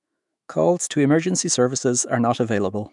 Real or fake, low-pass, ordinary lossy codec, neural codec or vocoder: fake; 10.8 kHz; none; autoencoder, 48 kHz, 128 numbers a frame, DAC-VAE, trained on Japanese speech